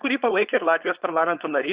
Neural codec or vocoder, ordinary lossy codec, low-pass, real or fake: codec, 16 kHz, 4.8 kbps, FACodec; Opus, 64 kbps; 3.6 kHz; fake